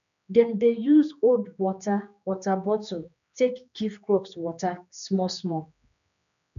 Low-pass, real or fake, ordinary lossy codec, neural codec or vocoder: 7.2 kHz; fake; none; codec, 16 kHz, 2 kbps, X-Codec, HuBERT features, trained on general audio